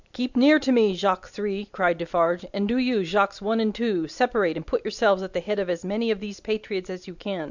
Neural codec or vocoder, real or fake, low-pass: none; real; 7.2 kHz